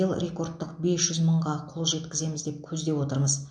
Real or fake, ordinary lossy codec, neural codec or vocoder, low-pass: real; MP3, 64 kbps; none; 9.9 kHz